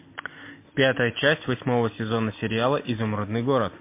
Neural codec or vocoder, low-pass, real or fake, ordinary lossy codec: none; 3.6 kHz; real; MP3, 24 kbps